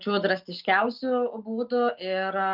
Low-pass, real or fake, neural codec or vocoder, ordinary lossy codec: 5.4 kHz; real; none; Opus, 24 kbps